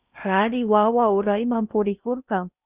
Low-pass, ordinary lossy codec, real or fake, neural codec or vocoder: 3.6 kHz; Opus, 64 kbps; fake; codec, 16 kHz in and 24 kHz out, 0.6 kbps, FocalCodec, streaming, 4096 codes